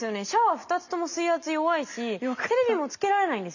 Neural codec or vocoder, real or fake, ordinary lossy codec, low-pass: none; real; none; 7.2 kHz